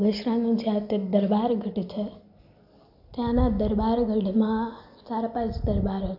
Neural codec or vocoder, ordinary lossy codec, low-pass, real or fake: none; AAC, 48 kbps; 5.4 kHz; real